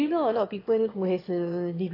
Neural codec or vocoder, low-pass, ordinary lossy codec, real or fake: autoencoder, 22.05 kHz, a latent of 192 numbers a frame, VITS, trained on one speaker; 5.4 kHz; AAC, 24 kbps; fake